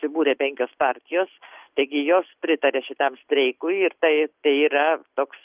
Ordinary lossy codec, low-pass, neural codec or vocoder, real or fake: Opus, 24 kbps; 3.6 kHz; none; real